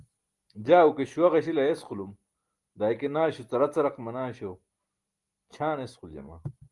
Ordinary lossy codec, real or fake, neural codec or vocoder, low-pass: Opus, 24 kbps; real; none; 10.8 kHz